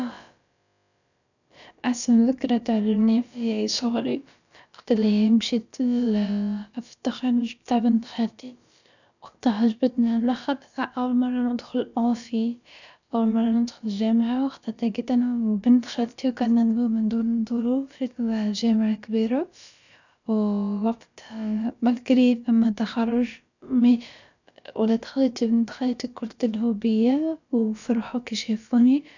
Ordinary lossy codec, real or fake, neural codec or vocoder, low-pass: none; fake; codec, 16 kHz, about 1 kbps, DyCAST, with the encoder's durations; 7.2 kHz